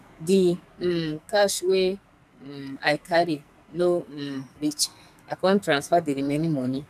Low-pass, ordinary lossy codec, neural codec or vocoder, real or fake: 14.4 kHz; none; codec, 44.1 kHz, 2.6 kbps, SNAC; fake